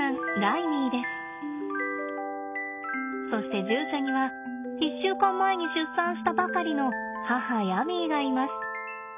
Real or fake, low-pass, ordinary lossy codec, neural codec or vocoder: real; 3.6 kHz; none; none